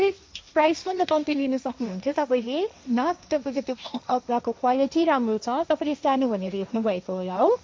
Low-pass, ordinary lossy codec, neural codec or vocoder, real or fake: none; none; codec, 16 kHz, 1.1 kbps, Voila-Tokenizer; fake